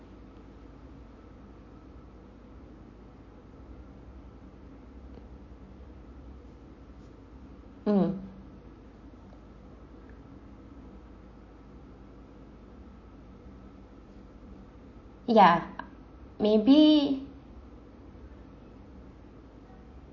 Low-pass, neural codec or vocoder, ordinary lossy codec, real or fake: 7.2 kHz; none; MP3, 32 kbps; real